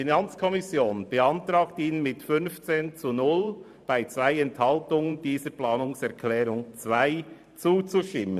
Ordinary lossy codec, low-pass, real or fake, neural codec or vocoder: none; 14.4 kHz; fake; vocoder, 44.1 kHz, 128 mel bands every 512 samples, BigVGAN v2